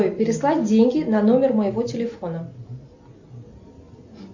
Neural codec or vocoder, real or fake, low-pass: none; real; 7.2 kHz